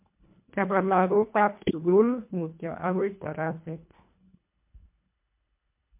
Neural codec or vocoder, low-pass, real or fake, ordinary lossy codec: codec, 24 kHz, 1.5 kbps, HILCodec; 3.6 kHz; fake; MP3, 32 kbps